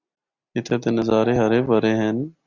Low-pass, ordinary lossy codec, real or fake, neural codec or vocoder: 7.2 kHz; Opus, 64 kbps; real; none